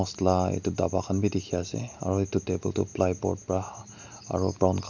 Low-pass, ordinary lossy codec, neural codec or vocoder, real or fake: 7.2 kHz; none; none; real